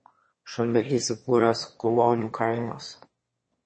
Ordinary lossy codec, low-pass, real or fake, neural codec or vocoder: MP3, 32 kbps; 9.9 kHz; fake; autoencoder, 22.05 kHz, a latent of 192 numbers a frame, VITS, trained on one speaker